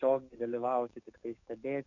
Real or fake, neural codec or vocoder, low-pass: fake; codec, 44.1 kHz, 7.8 kbps, DAC; 7.2 kHz